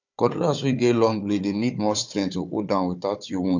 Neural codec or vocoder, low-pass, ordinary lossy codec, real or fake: codec, 16 kHz, 4 kbps, FunCodec, trained on Chinese and English, 50 frames a second; 7.2 kHz; none; fake